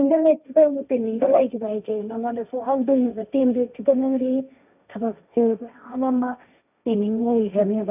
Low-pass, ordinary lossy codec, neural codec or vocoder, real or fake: 3.6 kHz; none; codec, 16 kHz, 1.1 kbps, Voila-Tokenizer; fake